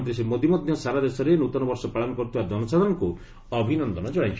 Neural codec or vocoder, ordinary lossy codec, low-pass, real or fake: none; none; none; real